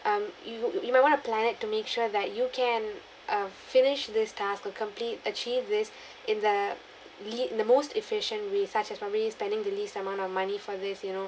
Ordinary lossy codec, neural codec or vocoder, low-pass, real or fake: none; none; none; real